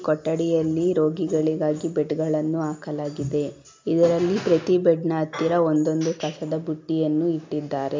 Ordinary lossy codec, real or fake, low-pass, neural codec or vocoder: MP3, 64 kbps; real; 7.2 kHz; none